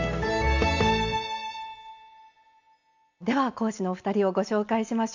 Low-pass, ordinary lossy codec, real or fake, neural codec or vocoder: 7.2 kHz; none; real; none